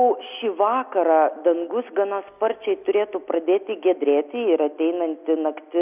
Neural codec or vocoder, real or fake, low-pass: none; real; 3.6 kHz